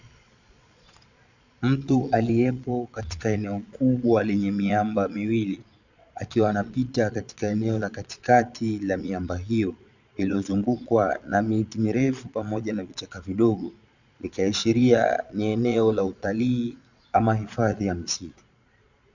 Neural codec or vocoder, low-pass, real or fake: vocoder, 22.05 kHz, 80 mel bands, Vocos; 7.2 kHz; fake